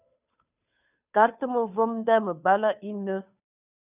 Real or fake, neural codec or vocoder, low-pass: fake; codec, 16 kHz, 2 kbps, FunCodec, trained on Chinese and English, 25 frames a second; 3.6 kHz